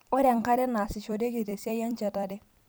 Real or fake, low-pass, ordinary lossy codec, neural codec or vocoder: fake; none; none; vocoder, 44.1 kHz, 128 mel bands every 256 samples, BigVGAN v2